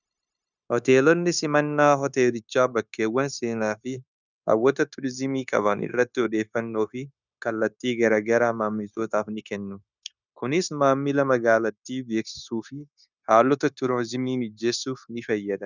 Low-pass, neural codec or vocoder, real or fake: 7.2 kHz; codec, 16 kHz, 0.9 kbps, LongCat-Audio-Codec; fake